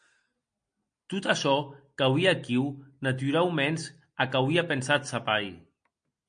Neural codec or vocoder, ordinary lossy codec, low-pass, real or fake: none; MP3, 48 kbps; 9.9 kHz; real